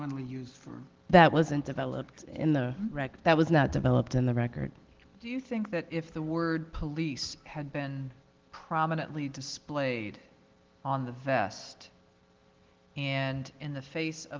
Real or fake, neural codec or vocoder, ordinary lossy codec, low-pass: real; none; Opus, 16 kbps; 7.2 kHz